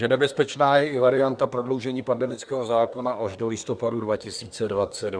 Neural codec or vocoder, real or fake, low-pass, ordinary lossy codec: codec, 24 kHz, 1 kbps, SNAC; fake; 9.9 kHz; Opus, 64 kbps